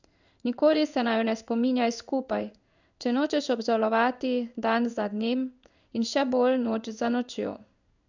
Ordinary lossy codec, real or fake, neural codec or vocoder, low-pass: none; fake; codec, 16 kHz in and 24 kHz out, 1 kbps, XY-Tokenizer; 7.2 kHz